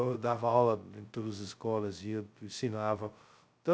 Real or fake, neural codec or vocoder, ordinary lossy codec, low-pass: fake; codec, 16 kHz, 0.2 kbps, FocalCodec; none; none